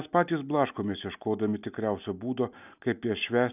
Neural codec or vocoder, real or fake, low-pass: none; real; 3.6 kHz